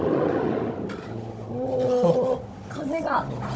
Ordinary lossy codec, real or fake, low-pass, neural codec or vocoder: none; fake; none; codec, 16 kHz, 16 kbps, FunCodec, trained on Chinese and English, 50 frames a second